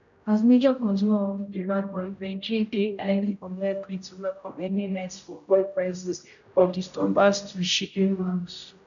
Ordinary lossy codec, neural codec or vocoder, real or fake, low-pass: none; codec, 16 kHz, 0.5 kbps, X-Codec, HuBERT features, trained on general audio; fake; 7.2 kHz